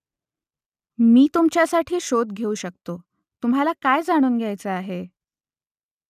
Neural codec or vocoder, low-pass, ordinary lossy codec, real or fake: none; 14.4 kHz; none; real